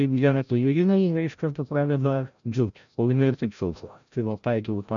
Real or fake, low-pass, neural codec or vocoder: fake; 7.2 kHz; codec, 16 kHz, 0.5 kbps, FreqCodec, larger model